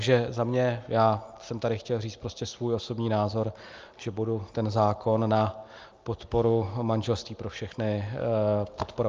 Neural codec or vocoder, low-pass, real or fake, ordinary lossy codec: none; 7.2 kHz; real; Opus, 24 kbps